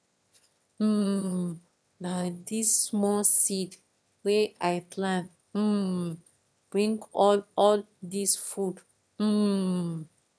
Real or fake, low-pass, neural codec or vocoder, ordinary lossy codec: fake; none; autoencoder, 22.05 kHz, a latent of 192 numbers a frame, VITS, trained on one speaker; none